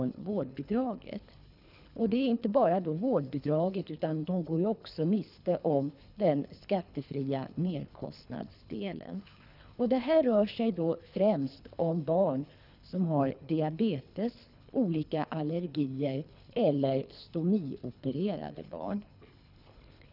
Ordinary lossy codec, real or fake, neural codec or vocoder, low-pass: none; fake; codec, 24 kHz, 3 kbps, HILCodec; 5.4 kHz